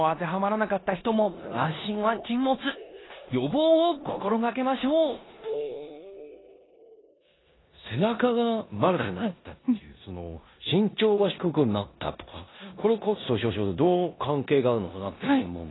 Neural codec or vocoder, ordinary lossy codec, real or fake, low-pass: codec, 16 kHz in and 24 kHz out, 0.9 kbps, LongCat-Audio-Codec, four codebook decoder; AAC, 16 kbps; fake; 7.2 kHz